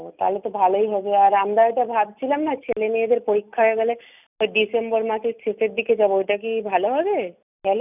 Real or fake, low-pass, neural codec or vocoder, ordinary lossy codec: real; 3.6 kHz; none; none